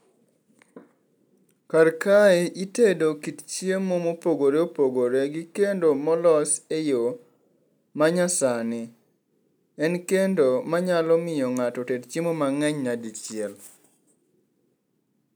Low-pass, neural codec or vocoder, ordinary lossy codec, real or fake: none; none; none; real